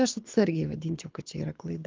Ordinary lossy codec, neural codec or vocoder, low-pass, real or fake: Opus, 32 kbps; codec, 24 kHz, 6 kbps, HILCodec; 7.2 kHz; fake